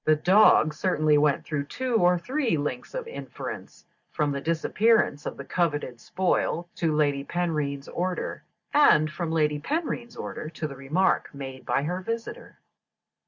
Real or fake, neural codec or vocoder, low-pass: real; none; 7.2 kHz